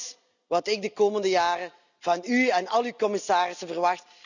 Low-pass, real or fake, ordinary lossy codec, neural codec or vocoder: 7.2 kHz; real; none; none